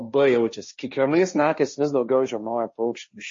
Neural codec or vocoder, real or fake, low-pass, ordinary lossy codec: codec, 16 kHz, 1.1 kbps, Voila-Tokenizer; fake; 7.2 kHz; MP3, 32 kbps